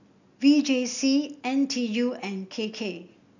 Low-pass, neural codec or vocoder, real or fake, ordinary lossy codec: 7.2 kHz; none; real; none